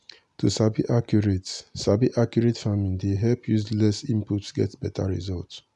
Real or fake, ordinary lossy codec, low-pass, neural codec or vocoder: real; none; 10.8 kHz; none